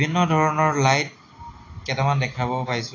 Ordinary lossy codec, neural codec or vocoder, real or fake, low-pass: AAC, 32 kbps; none; real; 7.2 kHz